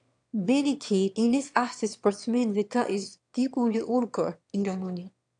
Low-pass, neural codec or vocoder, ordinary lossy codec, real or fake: 9.9 kHz; autoencoder, 22.05 kHz, a latent of 192 numbers a frame, VITS, trained on one speaker; AAC, 64 kbps; fake